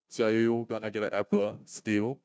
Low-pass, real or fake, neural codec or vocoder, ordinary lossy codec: none; fake; codec, 16 kHz, 0.5 kbps, FunCodec, trained on Chinese and English, 25 frames a second; none